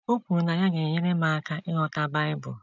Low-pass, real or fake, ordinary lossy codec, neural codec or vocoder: 7.2 kHz; real; none; none